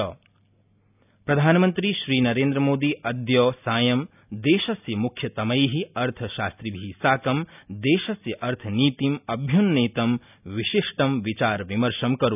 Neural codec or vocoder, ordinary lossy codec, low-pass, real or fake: none; none; 3.6 kHz; real